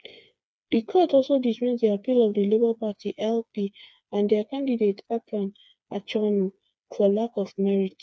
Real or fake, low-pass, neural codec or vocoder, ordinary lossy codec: fake; none; codec, 16 kHz, 4 kbps, FreqCodec, smaller model; none